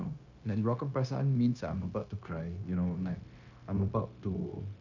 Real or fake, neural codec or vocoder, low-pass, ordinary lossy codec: fake; codec, 16 kHz, 0.9 kbps, LongCat-Audio-Codec; 7.2 kHz; none